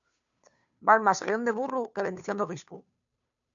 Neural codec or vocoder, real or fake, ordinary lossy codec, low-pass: codec, 16 kHz, 2 kbps, FunCodec, trained on Chinese and English, 25 frames a second; fake; MP3, 96 kbps; 7.2 kHz